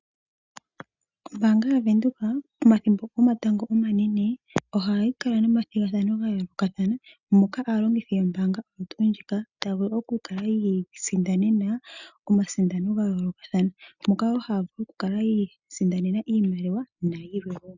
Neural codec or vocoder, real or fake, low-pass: none; real; 7.2 kHz